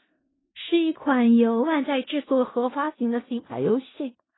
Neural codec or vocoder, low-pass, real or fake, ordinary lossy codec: codec, 16 kHz in and 24 kHz out, 0.4 kbps, LongCat-Audio-Codec, four codebook decoder; 7.2 kHz; fake; AAC, 16 kbps